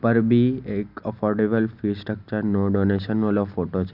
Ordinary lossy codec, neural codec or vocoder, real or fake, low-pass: Opus, 64 kbps; none; real; 5.4 kHz